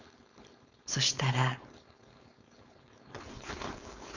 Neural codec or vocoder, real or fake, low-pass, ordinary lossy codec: codec, 16 kHz, 4.8 kbps, FACodec; fake; 7.2 kHz; none